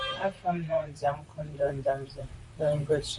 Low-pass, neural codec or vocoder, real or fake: 10.8 kHz; vocoder, 44.1 kHz, 128 mel bands, Pupu-Vocoder; fake